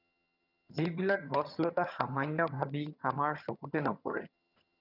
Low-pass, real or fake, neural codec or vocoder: 5.4 kHz; fake; vocoder, 22.05 kHz, 80 mel bands, HiFi-GAN